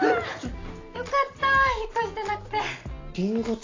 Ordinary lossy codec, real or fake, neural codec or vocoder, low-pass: AAC, 32 kbps; real; none; 7.2 kHz